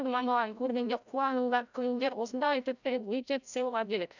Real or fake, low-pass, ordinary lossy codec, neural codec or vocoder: fake; 7.2 kHz; none; codec, 16 kHz, 0.5 kbps, FreqCodec, larger model